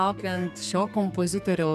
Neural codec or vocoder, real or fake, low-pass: codec, 32 kHz, 1.9 kbps, SNAC; fake; 14.4 kHz